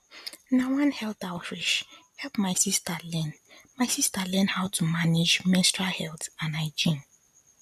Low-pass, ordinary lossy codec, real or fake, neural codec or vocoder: 14.4 kHz; MP3, 96 kbps; real; none